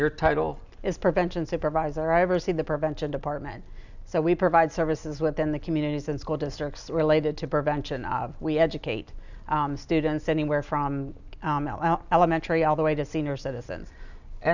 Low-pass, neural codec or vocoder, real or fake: 7.2 kHz; none; real